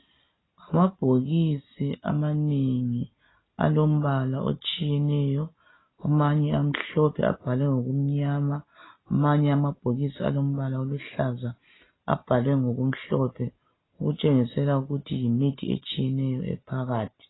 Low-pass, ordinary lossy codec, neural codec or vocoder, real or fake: 7.2 kHz; AAC, 16 kbps; none; real